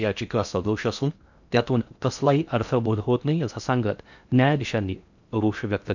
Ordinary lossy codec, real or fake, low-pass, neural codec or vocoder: none; fake; 7.2 kHz; codec, 16 kHz in and 24 kHz out, 0.6 kbps, FocalCodec, streaming, 2048 codes